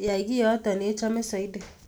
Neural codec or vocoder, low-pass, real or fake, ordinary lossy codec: none; none; real; none